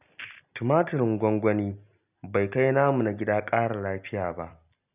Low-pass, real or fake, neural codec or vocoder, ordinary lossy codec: 3.6 kHz; real; none; none